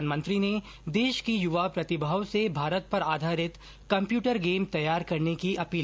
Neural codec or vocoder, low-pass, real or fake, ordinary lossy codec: none; none; real; none